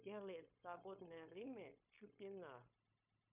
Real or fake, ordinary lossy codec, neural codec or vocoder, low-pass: fake; MP3, 32 kbps; codec, 16 kHz, 0.9 kbps, LongCat-Audio-Codec; 3.6 kHz